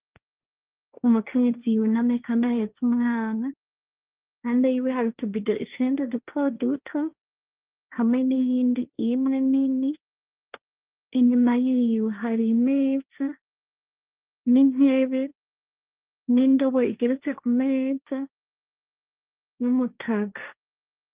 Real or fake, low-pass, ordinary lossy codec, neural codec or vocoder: fake; 3.6 kHz; Opus, 32 kbps; codec, 16 kHz, 1.1 kbps, Voila-Tokenizer